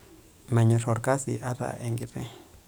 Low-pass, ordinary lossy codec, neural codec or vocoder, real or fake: none; none; codec, 44.1 kHz, 7.8 kbps, DAC; fake